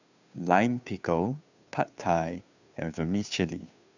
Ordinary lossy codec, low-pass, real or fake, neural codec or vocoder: none; 7.2 kHz; fake; codec, 16 kHz, 2 kbps, FunCodec, trained on Chinese and English, 25 frames a second